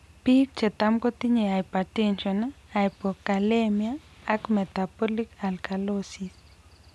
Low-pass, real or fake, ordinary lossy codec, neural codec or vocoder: none; real; none; none